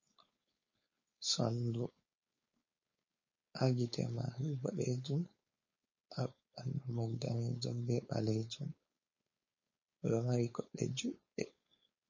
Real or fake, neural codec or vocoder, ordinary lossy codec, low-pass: fake; codec, 16 kHz, 4.8 kbps, FACodec; MP3, 32 kbps; 7.2 kHz